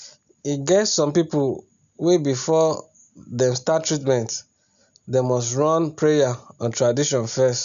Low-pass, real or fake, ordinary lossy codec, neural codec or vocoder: 7.2 kHz; real; none; none